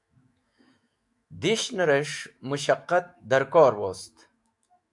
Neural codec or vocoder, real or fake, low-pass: autoencoder, 48 kHz, 128 numbers a frame, DAC-VAE, trained on Japanese speech; fake; 10.8 kHz